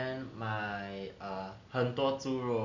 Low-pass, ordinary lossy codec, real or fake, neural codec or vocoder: 7.2 kHz; none; real; none